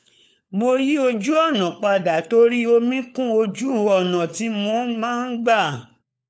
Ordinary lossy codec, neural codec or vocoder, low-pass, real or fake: none; codec, 16 kHz, 4 kbps, FunCodec, trained on LibriTTS, 50 frames a second; none; fake